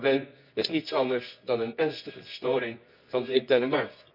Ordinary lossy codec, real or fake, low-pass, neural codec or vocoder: none; fake; 5.4 kHz; codec, 24 kHz, 0.9 kbps, WavTokenizer, medium music audio release